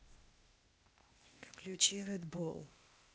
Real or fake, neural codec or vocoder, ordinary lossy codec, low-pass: fake; codec, 16 kHz, 0.8 kbps, ZipCodec; none; none